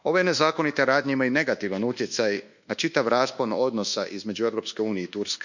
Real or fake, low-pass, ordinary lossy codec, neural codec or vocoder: fake; 7.2 kHz; none; codec, 24 kHz, 1.2 kbps, DualCodec